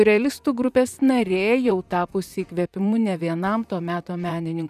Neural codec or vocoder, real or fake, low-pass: vocoder, 44.1 kHz, 128 mel bands, Pupu-Vocoder; fake; 14.4 kHz